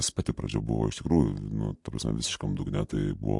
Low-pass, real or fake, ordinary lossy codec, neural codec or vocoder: 10.8 kHz; real; MP3, 64 kbps; none